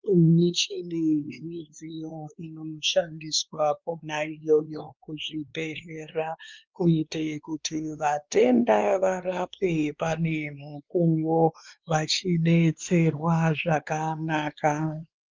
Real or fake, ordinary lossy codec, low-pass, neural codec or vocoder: fake; Opus, 32 kbps; 7.2 kHz; codec, 16 kHz, 2 kbps, X-Codec, WavLM features, trained on Multilingual LibriSpeech